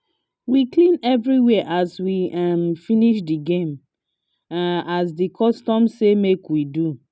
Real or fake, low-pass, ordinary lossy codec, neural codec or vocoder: real; none; none; none